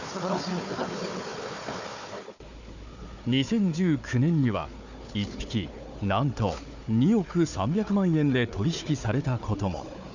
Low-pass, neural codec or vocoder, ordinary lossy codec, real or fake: 7.2 kHz; codec, 16 kHz, 4 kbps, FunCodec, trained on Chinese and English, 50 frames a second; none; fake